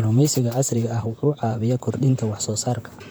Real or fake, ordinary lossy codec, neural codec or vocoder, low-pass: fake; none; vocoder, 44.1 kHz, 128 mel bands, Pupu-Vocoder; none